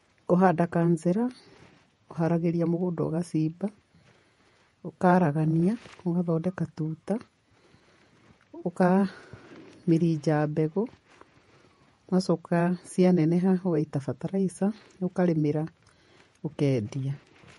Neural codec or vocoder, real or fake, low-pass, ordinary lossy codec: vocoder, 48 kHz, 128 mel bands, Vocos; fake; 19.8 kHz; MP3, 48 kbps